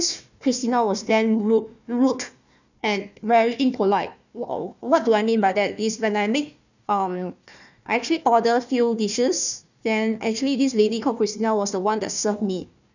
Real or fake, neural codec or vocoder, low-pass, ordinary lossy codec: fake; codec, 16 kHz, 1 kbps, FunCodec, trained on Chinese and English, 50 frames a second; 7.2 kHz; none